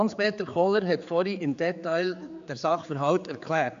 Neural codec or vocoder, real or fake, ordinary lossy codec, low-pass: codec, 16 kHz, 4 kbps, X-Codec, HuBERT features, trained on general audio; fake; AAC, 64 kbps; 7.2 kHz